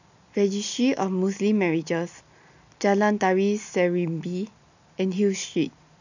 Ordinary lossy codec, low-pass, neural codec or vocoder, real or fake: none; 7.2 kHz; none; real